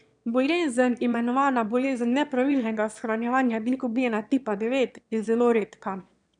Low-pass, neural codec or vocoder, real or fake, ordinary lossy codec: 9.9 kHz; autoencoder, 22.05 kHz, a latent of 192 numbers a frame, VITS, trained on one speaker; fake; none